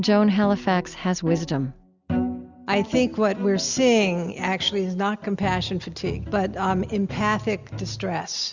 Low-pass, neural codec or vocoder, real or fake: 7.2 kHz; none; real